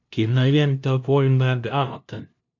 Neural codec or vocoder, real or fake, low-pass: codec, 16 kHz, 0.5 kbps, FunCodec, trained on LibriTTS, 25 frames a second; fake; 7.2 kHz